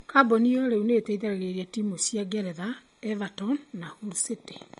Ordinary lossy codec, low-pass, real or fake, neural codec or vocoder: MP3, 48 kbps; 19.8 kHz; real; none